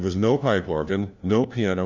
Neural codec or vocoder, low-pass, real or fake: codec, 16 kHz, 1 kbps, FunCodec, trained on LibriTTS, 50 frames a second; 7.2 kHz; fake